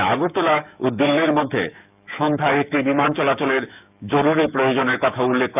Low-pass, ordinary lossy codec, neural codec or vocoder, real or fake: 3.6 kHz; none; autoencoder, 48 kHz, 128 numbers a frame, DAC-VAE, trained on Japanese speech; fake